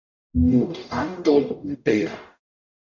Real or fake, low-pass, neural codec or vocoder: fake; 7.2 kHz; codec, 44.1 kHz, 0.9 kbps, DAC